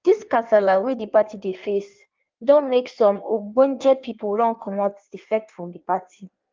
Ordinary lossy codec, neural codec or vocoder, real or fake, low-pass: Opus, 32 kbps; codec, 16 kHz in and 24 kHz out, 1.1 kbps, FireRedTTS-2 codec; fake; 7.2 kHz